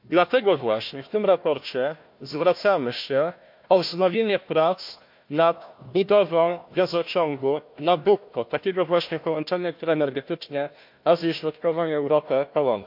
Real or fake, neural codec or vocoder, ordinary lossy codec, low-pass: fake; codec, 16 kHz, 1 kbps, FunCodec, trained on Chinese and English, 50 frames a second; MP3, 48 kbps; 5.4 kHz